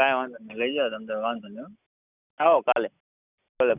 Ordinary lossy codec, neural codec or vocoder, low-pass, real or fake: none; none; 3.6 kHz; real